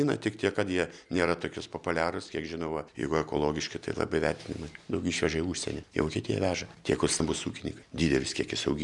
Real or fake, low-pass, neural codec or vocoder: real; 10.8 kHz; none